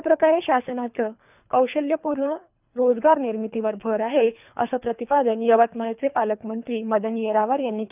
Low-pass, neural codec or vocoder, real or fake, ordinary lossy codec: 3.6 kHz; codec, 24 kHz, 3 kbps, HILCodec; fake; none